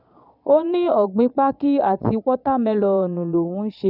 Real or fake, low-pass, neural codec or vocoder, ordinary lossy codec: fake; 5.4 kHz; vocoder, 22.05 kHz, 80 mel bands, Vocos; none